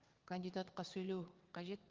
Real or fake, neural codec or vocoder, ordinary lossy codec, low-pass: fake; vocoder, 22.05 kHz, 80 mel bands, WaveNeXt; Opus, 32 kbps; 7.2 kHz